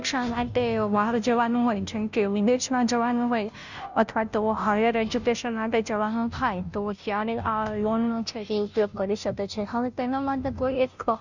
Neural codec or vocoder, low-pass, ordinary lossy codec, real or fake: codec, 16 kHz, 0.5 kbps, FunCodec, trained on Chinese and English, 25 frames a second; 7.2 kHz; none; fake